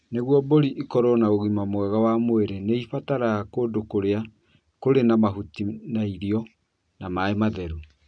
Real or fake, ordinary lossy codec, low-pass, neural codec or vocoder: real; none; 9.9 kHz; none